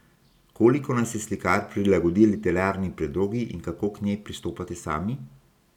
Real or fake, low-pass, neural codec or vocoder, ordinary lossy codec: real; 19.8 kHz; none; none